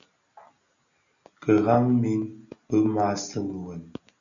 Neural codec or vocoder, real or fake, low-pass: none; real; 7.2 kHz